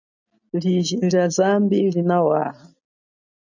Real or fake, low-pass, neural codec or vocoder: real; 7.2 kHz; none